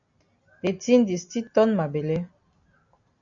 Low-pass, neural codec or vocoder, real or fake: 7.2 kHz; none; real